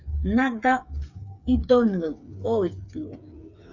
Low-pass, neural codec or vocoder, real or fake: 7.2 kHz; codec, 16 kHz, 8 kbps, FreqCodec, smaller model; fake